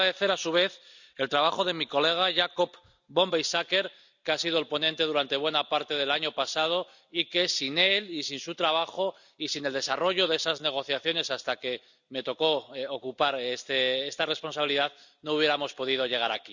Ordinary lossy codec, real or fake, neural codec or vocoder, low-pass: none; real; none; 7.2 kHz